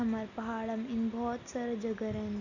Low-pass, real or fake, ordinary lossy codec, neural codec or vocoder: 7.2 kHz; real; none; none